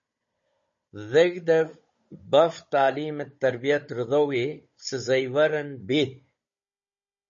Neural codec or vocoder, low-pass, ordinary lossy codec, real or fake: codec, 16 kHz, 16 kbps, FunCodec, trained on Chinese and English, 50 frames a second; 7.2 kHz; MP3, 32 kbps; fake